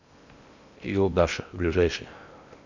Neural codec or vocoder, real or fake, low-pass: codec, 16 kHz in and 24 kHz out, 0.8 kbps, FocalCodec, streaming, 65536 codes; fake; 7.2 kHz